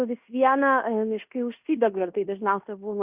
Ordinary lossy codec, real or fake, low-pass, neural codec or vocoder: Opus, 64 kbps; fake; 3.6 kHz; codec, 16 kHz in and 24 kHz out, 0.9 kbps, LongCat-Audio-Codec, fine tuned four codebook decoder